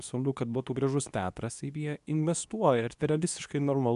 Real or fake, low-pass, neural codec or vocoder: fake; 10.8 kHz; codec, 24 kHz, 0.9 kbps, WavTokenizer, medium speech release version 2